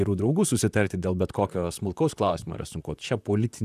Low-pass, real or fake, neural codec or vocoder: 14.4 kHz; fake; vocoder, 44.1 kHz, 128 mel bands, Pupu-Vocoder